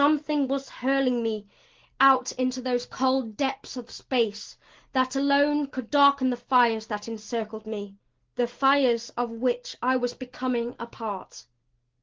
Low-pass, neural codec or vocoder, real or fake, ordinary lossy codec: 7.2 kHz; none; real; Opus, 16 kbps